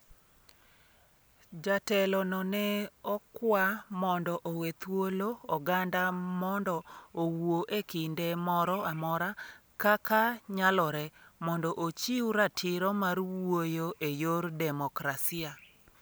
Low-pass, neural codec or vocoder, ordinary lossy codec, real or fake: none; none; none; real